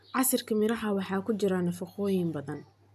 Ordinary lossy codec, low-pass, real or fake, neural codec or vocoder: none; 14.4 kHz; real; none